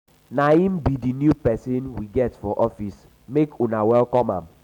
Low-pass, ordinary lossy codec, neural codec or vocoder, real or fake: 19.8 kHz; none; none; real